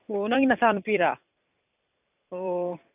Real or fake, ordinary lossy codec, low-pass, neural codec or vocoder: fake; none; 3.6 kHz; vocoder, 44.1 kHz, 128 mel bands every 256 samples, BigVGAN v2